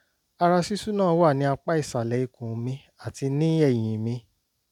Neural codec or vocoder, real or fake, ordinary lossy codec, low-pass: none; real; none; 19.8 kHz